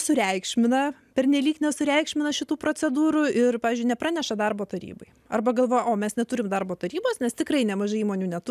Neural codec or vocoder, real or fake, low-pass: none; real; 14.4 kHz